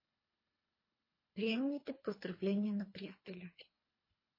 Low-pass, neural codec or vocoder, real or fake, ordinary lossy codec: 5.4 kHz; codec, 24 kHz, 3 kbps, HILCodec; fake; MP3, 24 kbps